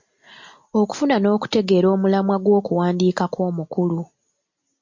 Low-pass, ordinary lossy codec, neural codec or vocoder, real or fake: 7.2 kHz; MP3, 48 kbps; none; real